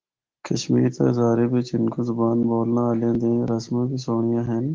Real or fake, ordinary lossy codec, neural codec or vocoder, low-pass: real; Opus, 16 kbps; none; 7.2 kHz